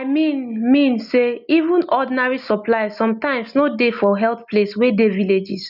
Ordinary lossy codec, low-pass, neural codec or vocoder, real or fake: none; 5.4 kHz; none; real